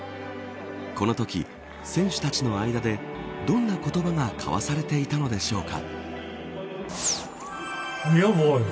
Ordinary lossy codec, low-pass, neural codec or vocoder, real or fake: none; none; none; real